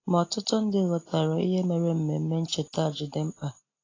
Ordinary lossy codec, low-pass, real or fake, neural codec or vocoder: AAC, 32 kbps; 7.2 kHz; real; none